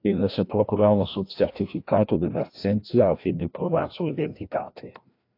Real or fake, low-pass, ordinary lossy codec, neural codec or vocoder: fake; 5.4 kHz; AAC, 32 kbps; codec, 16 kHz, 1 kbps, FreqCodec, larger model